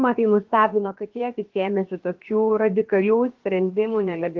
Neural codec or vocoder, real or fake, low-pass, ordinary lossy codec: codec, 16 kHz, about 1 kbps, DyCAST, with the encoder's durations; fake; 7.2 kHz; Opus, 16 kbps